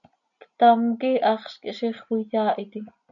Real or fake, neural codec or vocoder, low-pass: real; none; 7.2 kHz